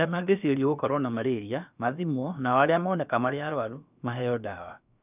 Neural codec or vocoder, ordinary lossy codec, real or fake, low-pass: codec, 16 kHz, about 1 kbps, DyCAST, with the encoder's durations; none; fake; 3.6 kHz